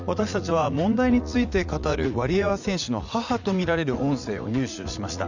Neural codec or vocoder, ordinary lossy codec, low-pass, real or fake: vocoder, 44.1 kHz, 80 mel bands, Vocos; none; 7.2 kHz; fake